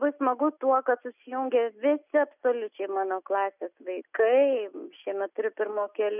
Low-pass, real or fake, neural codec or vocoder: 3.6 kHz; real; none